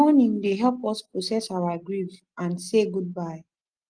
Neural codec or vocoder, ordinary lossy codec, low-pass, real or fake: none; Opus, 24 kbps; 9.9 kHz; real